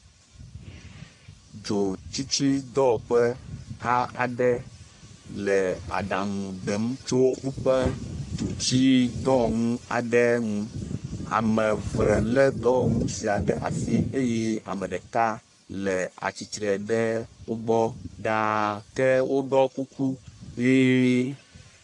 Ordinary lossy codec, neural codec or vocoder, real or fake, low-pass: AAC, 64 kbps; codec, 44.1 kHz, 1.7 kbps, Pupu-Codec; fake; 10.8 kHz